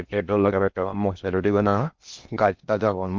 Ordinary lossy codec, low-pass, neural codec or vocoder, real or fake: Opus, 24 kbps; 7.2 kHz; codec, 16 kHz in and 24 kHz out, 0.6 kbps, FocalCodec, streaming, 4096 codes; fake